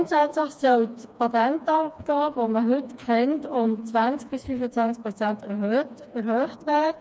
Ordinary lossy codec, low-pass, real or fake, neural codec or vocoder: none; none; fake; codec, 16 kHz, 2 kbps, FreqCodec, smaller model